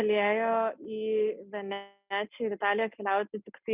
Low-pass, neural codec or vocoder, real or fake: 3.6 kHz; none; real